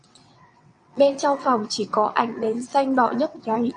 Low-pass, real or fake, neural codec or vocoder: 9.9 kHz; fake; vocoder, 22.05 kHz, 80 mel bands, WaveNeXt